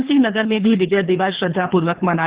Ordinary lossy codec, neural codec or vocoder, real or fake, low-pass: Opus, 16 kbps; codec, 24 kHz, 3 kbps, HILCodec; fake; 3.6 kHz